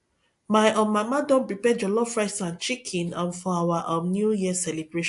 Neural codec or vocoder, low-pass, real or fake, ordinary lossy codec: none; 10.8 kHz; real; AAC, 64 kbps